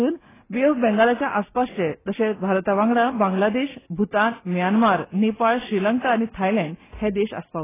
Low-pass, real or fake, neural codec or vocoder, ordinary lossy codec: 3.6 kHz; real; none; AAC, 16 kbps